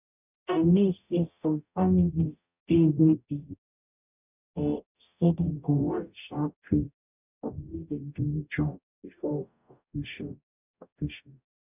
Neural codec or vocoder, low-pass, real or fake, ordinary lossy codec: codec, 44.1 kHz, 0.9 kbps, DAC; 3.6 kHz; fake; none